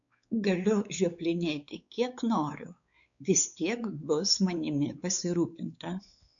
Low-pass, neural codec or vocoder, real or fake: 7.2 kHz; codec, 16 kHz, 4 kbps, X-Codec, WavLM features, trained on Multilingual LibriSpeech; fake